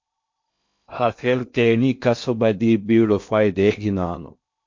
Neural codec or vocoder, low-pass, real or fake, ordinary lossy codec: codec, 16 kHz in and 24 kHz out, 0.6 kbps, FocalCodec, streaming, 2048 codes; 7.2 kHz; fake; MP3, 48 kbps